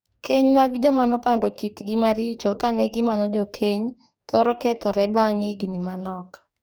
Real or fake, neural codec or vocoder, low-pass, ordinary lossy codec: fake; codec, 44.1 kHz, 2.6 kbps, DAC; none; none